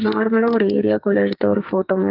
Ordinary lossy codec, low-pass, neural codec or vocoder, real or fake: Opus, 32 kbps; 5.4 kHz; codec, 44.1 kHz, 2.6 kbps, SNAC; fake